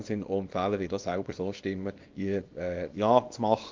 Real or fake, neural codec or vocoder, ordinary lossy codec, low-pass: fake; codec, 24 kHz, 0.9 kbps, WavTokenizer, small release; Opus, 32 kbps; 7.2 kHz